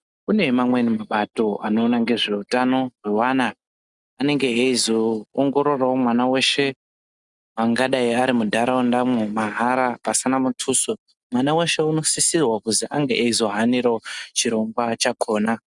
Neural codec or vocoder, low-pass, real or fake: none; 10.8 kHz; real